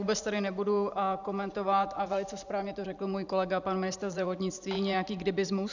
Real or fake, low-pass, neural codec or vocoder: fake; 7.2 kHz; vocoder, 44.1 kHz, 128 mel bands every 512 samples, BigVGAN v2